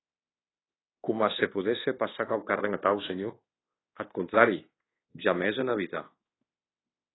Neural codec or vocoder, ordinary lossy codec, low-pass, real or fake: codec, 24 kHz, 1.2 kbps, DualCodec; AAC, 16 kbps; 7.2 kHz; fake